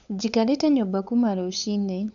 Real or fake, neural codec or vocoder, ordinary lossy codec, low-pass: fake; codec, 16 kHz, 8 kbps, FunCodec, trained on LibriTTS, 25 frames a second; none; 7.2 kHz